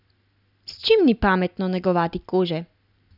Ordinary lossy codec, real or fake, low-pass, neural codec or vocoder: none; real; 5.4 kHz; none